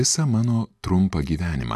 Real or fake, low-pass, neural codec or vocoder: real; 14.4 kHz; none